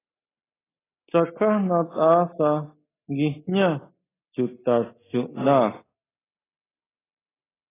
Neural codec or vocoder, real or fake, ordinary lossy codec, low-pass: none; real; AAC, 16 kbps; 3.6 kHz